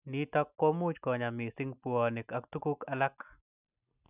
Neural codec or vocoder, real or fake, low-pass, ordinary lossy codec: none; real; 3.6 kHz; none